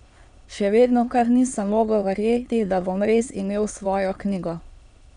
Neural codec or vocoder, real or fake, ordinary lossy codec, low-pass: autoencoder, 22.05 kHz, a latent of 192 numbers a frame, VITS, trained on many speakers; fake; MP3, 96 kbps; 9.9 kHz